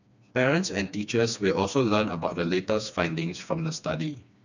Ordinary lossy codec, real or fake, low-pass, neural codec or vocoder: none; fake; 7.2 kHz; codec, 16 kHz, 2 kbps, FreqCodec, smaller model